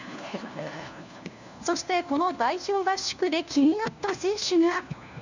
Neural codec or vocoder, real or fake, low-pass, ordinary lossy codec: codec, 16 kHz, 1 kbps, FunCodec, trained on LibriTTS, 50 frames a second; fake; 7.2 kHz; none